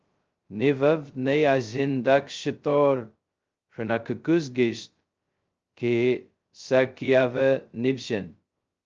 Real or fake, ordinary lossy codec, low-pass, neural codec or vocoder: fake; Opus, 24 kbps; 7.2 kHz; codec, 16 kHz, 0.2 kbps, FocalCodec